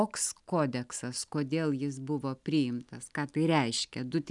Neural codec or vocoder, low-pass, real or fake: none; 10.8 kHz; real